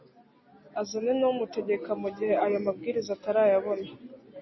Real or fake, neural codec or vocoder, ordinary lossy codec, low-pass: real; none; MP3, 24 kbps; 7.2 kHz